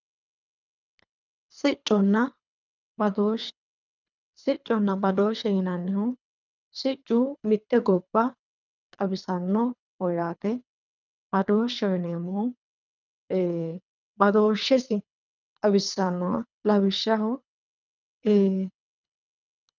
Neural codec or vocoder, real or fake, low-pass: codec, 24 kHz, 3 kbps, HILCodec; fake; 7.2 kHz